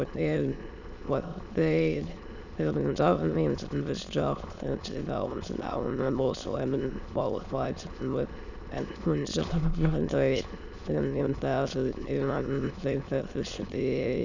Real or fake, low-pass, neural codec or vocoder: fake; 7.2 kHz; autoencoder, 22.05 kHz, a latent of 192 numbers a frame, VITS, trained on many speakers